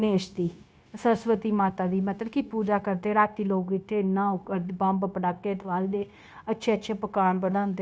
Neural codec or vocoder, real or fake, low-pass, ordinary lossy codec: codec, 16 kHz, 0.9 kbps, LongCat-Audio-Codec; fake; none; none